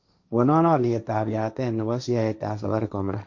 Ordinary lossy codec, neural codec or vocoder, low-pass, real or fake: none; codec, 16 kHz, 1.1 kbps, Voila-Tokenizer; 7.2 kHz; fake